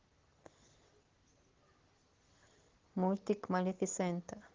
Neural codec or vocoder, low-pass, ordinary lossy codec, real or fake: none; 7.2 kHz; Opus, 16 kbps; real